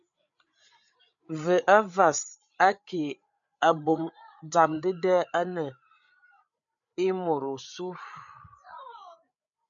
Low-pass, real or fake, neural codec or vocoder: 7.2 kHz; fake; codec, 16 kHz, 8 kbps, FreqCodec, larger model